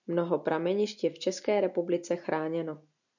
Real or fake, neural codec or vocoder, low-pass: real; none; 7.2 kHz